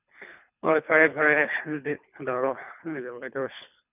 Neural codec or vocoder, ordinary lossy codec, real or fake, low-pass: codec, 24 kHz, 3 kbps, HILCodec; none; fake; 3.6 kHz